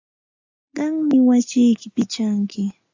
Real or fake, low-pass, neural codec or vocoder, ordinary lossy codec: real; 7.2 kHz; none; AAC, 48 kbps